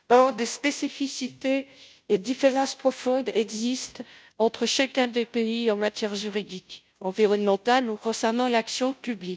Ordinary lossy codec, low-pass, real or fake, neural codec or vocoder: none; none; fake; codec, 16 kHz, 0.5 kbps, FunCodec, trained on Chinese and English, 25 frames a second